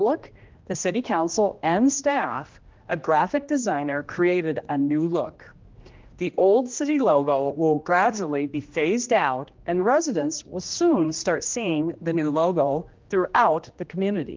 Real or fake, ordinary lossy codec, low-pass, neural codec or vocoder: fake; Opus, 32 kbps; 7.2 kHz; codec, 16 kHz, 1 kbps, X-Codec, HuBERT features, trained on general audio